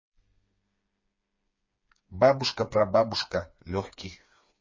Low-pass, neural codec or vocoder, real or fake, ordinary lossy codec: 7.2 kHz; codec, 16 kHz, 4 kbps, FreqCodec, smaller model; fake; MP3, 32 kbps